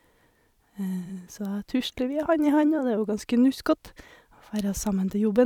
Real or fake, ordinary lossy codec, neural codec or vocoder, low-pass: fake; none; vocoder, 44.1 kHz, 128 mel bands every 256 samples, BigVGAN v2; 19.8 kHz